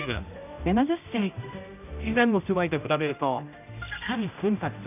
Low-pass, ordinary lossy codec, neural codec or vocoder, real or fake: 3.6 kHz; none; codec, 16 kHz, 0.5 kbps, X-Codec, HuBERT features, trained on general audio; fake